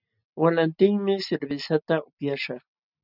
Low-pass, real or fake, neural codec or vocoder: 5.4 kHz; real; none